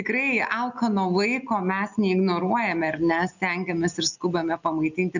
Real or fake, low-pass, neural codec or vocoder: real; 7.2 kHz; none